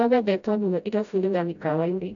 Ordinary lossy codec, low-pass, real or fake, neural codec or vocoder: none; 7.2 kHz; fake; codec, 16 kHz, 0.5 kbps, FreqCodec, smaller model